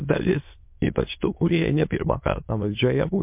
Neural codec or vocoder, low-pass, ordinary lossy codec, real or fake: autoencoder, 22.05 kHz, a latent of 192 numbers a frame, VITS, trained on many speakers; 3.6 kHz; MP3, 32 kbps; fake